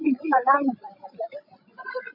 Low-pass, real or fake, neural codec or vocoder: 5.4 kHz; fake; codec, 16 kHz, 16 kbps, FreqCodec, larger model